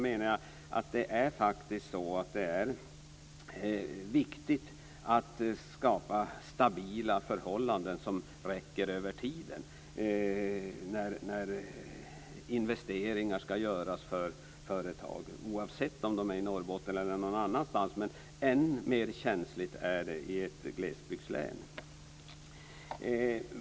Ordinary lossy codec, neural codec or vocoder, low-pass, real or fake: none; none; none; real